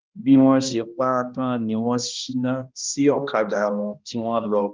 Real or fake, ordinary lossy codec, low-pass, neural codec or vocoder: fake; Opus, 32 kbps; 7.2 kHz; codec, 16 kHz, 1 kbps, X-Codec, HuBERT features, trained on balanced general audio